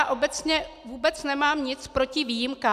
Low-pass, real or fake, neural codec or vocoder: 14.4 kHz; real; none